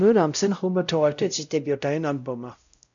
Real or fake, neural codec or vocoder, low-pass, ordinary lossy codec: fake; codec, 16 kHz, 0.5 kbps, X-Codec, WavLM features, trained on Multilingual LibriSpeech; 7.2 kHz; MP3, 96 kbps